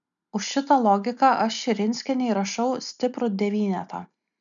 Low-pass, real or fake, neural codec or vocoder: 7.2 kHz; real; none